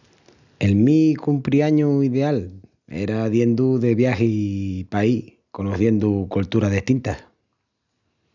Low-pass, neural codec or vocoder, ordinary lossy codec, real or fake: 7.2 kHz; none; none; real